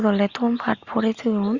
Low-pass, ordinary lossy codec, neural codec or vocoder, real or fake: 7.2 kHz; Opus, 64 kbps; none; real